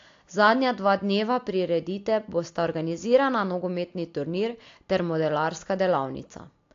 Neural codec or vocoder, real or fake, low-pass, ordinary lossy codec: none; real; 7.2 kHz; AAC, 48 kbps